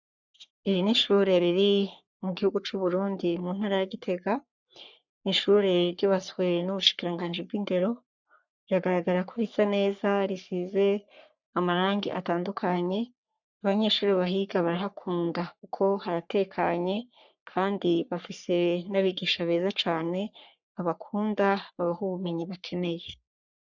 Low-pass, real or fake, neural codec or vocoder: 7.2 kHz; fake; codec, 44.1 kHz, 3.4 kbps, Pupu-Codec